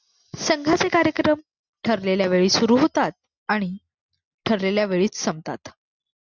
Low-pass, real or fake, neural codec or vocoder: 7.2 kHz; real; none